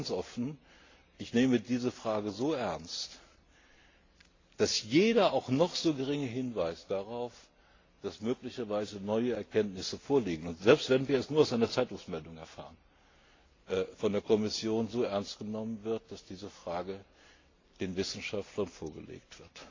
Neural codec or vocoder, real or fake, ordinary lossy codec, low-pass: none; real; AAC, 32 kbps; 7.2 kHz